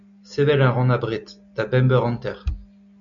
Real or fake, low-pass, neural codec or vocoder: real; 7.2 kHz; none